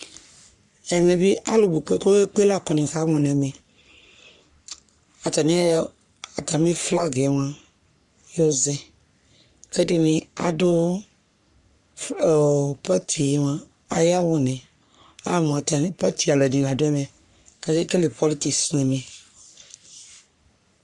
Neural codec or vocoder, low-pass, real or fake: codec, 44.1 kHz, 3.4 kbps, Pupu-Codec; 10.8 kHz; fake